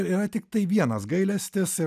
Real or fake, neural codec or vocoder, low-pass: real; none; 14.4 kHz